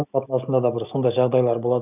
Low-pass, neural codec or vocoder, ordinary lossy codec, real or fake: 3.6 kHz; none; none; real